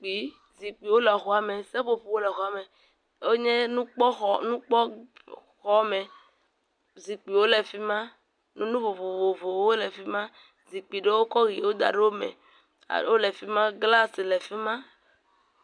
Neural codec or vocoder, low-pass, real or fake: none; 9.9 kHz; real